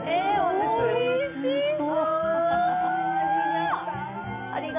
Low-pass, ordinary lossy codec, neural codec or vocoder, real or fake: 3.6 kHz; MP3, 32 kbps; none; real